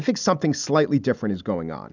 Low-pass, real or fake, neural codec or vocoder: 7.2 kHz; real; none